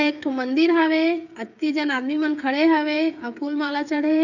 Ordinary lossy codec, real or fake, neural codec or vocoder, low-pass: none; fake; codec, 16 kHz, 8 kbps, FreqCodec, smaller model; 7.2 kHz